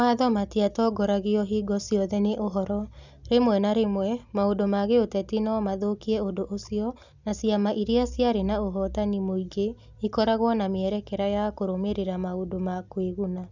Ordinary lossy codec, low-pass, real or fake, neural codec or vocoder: none; 7.2 kHz; real; none